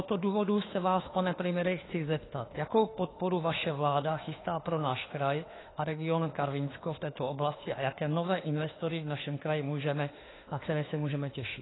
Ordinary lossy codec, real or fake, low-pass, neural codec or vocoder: AAC, 16 kbps; fake; 7.2 kHz; autoencoder, 48 kHz, 32 numbers a frame, DAC-VAE, trained on Japanese speech